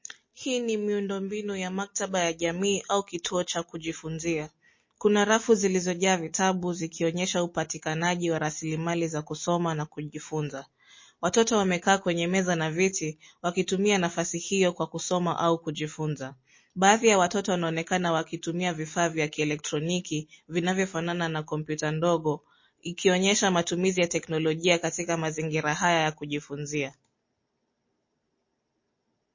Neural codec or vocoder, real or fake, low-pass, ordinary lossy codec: none; real; 7.2 kHz; MP3, 32 kbps